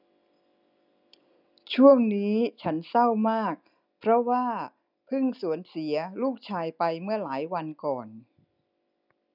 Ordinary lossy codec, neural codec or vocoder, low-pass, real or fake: none; none; 5.4 kHz; real